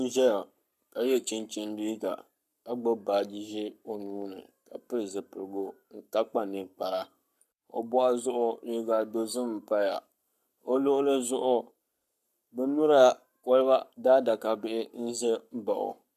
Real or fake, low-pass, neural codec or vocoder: fake; 14.4 kHz; codec, 44.1 kHz, 7.8 kbps, Pupu-Codec